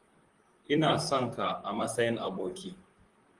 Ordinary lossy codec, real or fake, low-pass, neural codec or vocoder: Opus, 24 kbps; fake; 10.8 kHz; vocoder, 44.1 kHz, 128 mel bands, Pupu-Vocoder